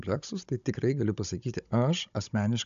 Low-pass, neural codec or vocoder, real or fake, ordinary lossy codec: 7.2 kHz; codec, 16 kHz, 16 kbps, FunCodec, trained on Chinese and English, 50 frames a second; fake; AAC, 96 kbps